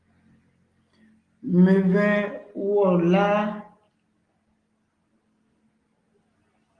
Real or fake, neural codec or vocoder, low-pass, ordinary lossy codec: real; none; 9.9 kHz; Opus, 24 kbps